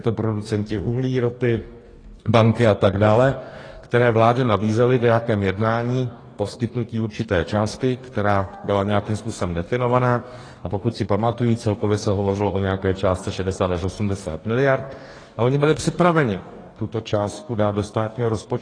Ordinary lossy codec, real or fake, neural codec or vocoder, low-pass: AAC, 32 kbps; fake; codec, 44.1 kHz, 2.6 kbps, DAC; 9.9 kHz